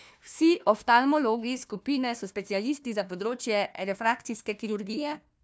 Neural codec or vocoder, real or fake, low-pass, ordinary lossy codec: codec, 16 kHz, 1 kbps, FunCodec, trained on Chinese and English, 50 frames a second; fake; none; none